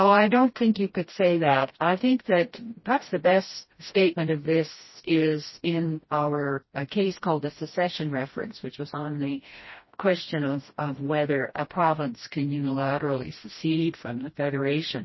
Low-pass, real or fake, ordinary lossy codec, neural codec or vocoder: 7.2 kHz; fake; MP3, 24 kbps; codec, 16 kHz, 1 kbps, FreqCodec, smaller model